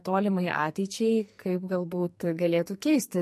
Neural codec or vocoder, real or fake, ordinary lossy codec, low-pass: codec, 44.1 kHz, 2.6 kbps, SNAC; fake; MP3, 64 kbps; 14.4 kHz